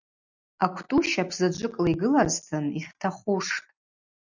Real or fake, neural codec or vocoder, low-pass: real; none; 7.2 kHz